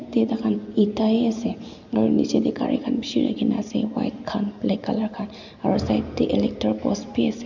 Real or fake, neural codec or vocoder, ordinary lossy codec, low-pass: real; none; none; 7.2 kHz